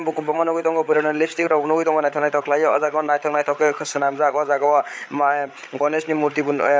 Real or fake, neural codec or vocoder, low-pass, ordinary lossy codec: fake; codec, 16 kHz, 8 kbps, FreqCodec, larger model; none; none